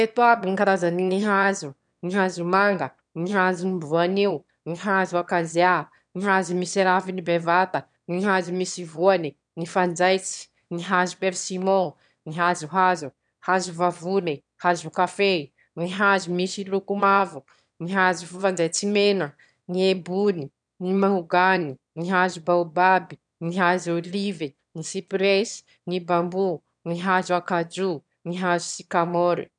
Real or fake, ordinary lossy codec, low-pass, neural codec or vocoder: fake; none; 9.9 kHz; autoencoder, 22.05 kHz, a latent of 192 numbers a frame, VITS, trained on one speaker